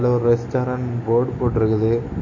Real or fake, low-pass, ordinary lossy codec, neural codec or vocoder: real; 7.2 kHz; MP3, 32 kbps; none